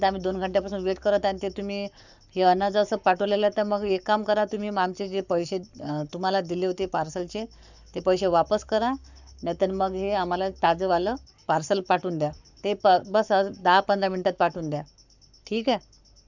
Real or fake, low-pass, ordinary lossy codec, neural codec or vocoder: fake; 7.2 kHz; none; codec, 44.1 kHz, 7.8 kbps, Pupu-Codec